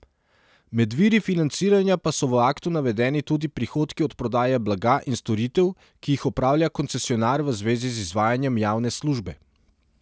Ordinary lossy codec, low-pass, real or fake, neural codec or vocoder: none; none; real; none